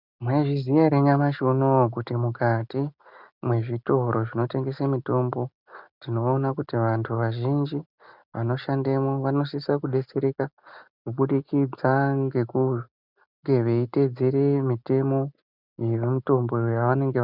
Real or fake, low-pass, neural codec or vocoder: real; 5.4 kHz; none